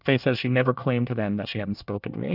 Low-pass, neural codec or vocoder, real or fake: 5.4 kHz; codec, 24 kHz, 1 kbps, SNAC; fake